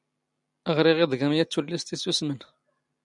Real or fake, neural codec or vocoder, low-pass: real; none; 10.8 kHz